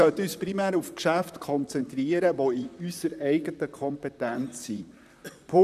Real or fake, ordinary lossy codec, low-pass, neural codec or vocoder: fake; none; 14.4 kHz; vocoder, 44.1 kHz, 128 mel bands, Pupu-Vocoder